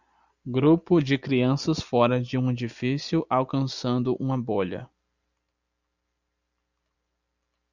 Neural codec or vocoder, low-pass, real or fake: none; 7.2 kHz; real